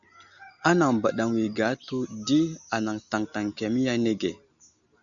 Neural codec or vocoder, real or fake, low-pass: none; real; 7.2 kHz